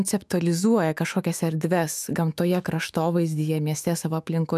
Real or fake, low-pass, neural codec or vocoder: fake; 14.4 kHz; autoencoder, 48 kHz, 128 numbers a frame, DAC-VAE, trained on Japanese speech